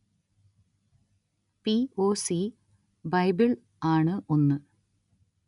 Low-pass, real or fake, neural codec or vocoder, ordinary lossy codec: 10.8 kHz; real; none; none